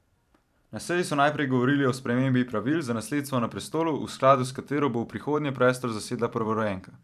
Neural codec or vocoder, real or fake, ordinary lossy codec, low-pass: vocoder, 44.1 kHz, 128 mel bands every 512 samples, BigVGAN v2; fake; none; 14.4 kHz